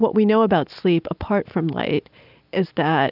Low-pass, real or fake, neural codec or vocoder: 5.4 kHz; real; none